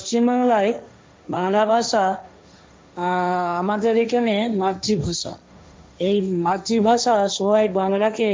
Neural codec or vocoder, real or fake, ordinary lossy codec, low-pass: codec, 16 kHz, 1.1 kbps, Voila-Tokenizer; fake; none; none